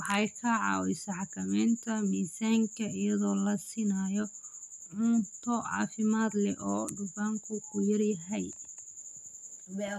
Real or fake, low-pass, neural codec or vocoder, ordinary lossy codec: real; 14.4 kHz; none; none